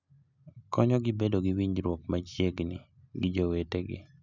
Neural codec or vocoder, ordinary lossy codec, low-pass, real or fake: none; none; 7.2 kHz; real